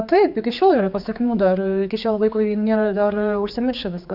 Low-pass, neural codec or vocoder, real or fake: 5.4 kHz; codec, 16 kHz, 4 kbps, X-Codec, HuBERT features, trained on general audio; fake